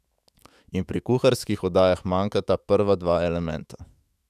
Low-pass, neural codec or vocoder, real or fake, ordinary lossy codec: 14.4 kHz; autoencoder, 48 kHz, 128 numbers a frame, DAC-VAE, trained on Japanese speech; fake; none